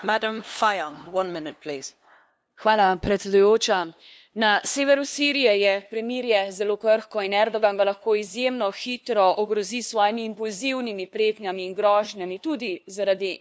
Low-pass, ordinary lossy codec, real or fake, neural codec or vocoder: none; none; fake; codec, 16 kHz, 2 kbps, FunCodec, trained on LibriTTS, 25 frames a second